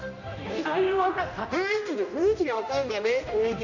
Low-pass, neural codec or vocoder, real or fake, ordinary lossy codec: 7.2 kHz; codec, 16 kHz, 1 kbps, X-Codec, HuBERT features, trained on general audio; fake; none